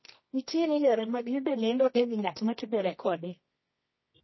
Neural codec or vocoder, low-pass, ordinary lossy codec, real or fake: codec, 24 kHz, 0.9 kbps, WavTokenizer, medium music audio release; 7.2 kHz; MP3, 24 kbps; fake